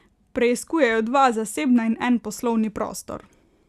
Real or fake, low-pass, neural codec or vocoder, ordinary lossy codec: real; 14.4 kHz; none; Opus, 64 kbps